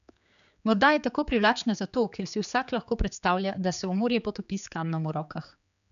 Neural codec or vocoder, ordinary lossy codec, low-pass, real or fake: codec, 16 kHz, 4 kbps, X-Codec, HuBERT features, trained on general audio; none; 7.2 kHz; fake